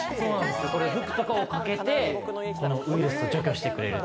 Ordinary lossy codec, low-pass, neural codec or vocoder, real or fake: none; none; none; real